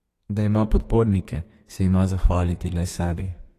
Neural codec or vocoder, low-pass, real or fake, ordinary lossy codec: codec, 32 kHz, 1.9 kbps, SNAC; 14.4 kHz; fake; AAC, 48 kbps